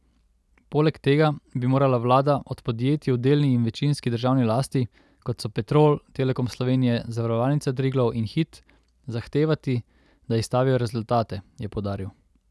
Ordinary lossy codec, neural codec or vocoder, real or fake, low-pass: none; none; real; none